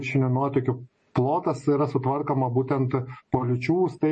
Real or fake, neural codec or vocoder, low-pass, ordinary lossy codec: real; none; 10.8 kHz; MP3, 32 kbps